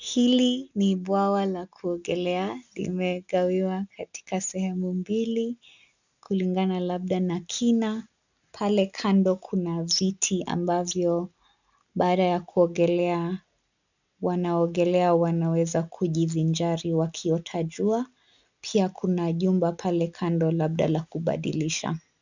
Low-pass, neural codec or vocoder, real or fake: 7.2 kHz; none; real